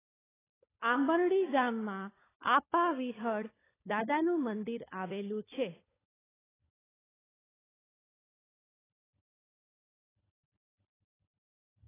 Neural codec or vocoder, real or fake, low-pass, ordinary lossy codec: codec, 16 kHz, 16 kbps, FunCodec, trained on LibriTTS, 50 frames a second; fake; 3.6 kHz; AAC, 16 kbps